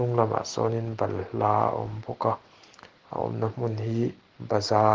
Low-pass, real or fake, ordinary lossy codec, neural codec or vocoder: 7.2 kHz; real; Opus, 16 kbps; none